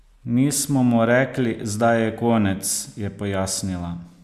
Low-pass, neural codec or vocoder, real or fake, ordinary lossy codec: 14.4 kHz; none; real; MP3, 96 kbps